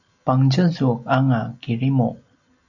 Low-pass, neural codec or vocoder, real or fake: 7.2 kHz; none; real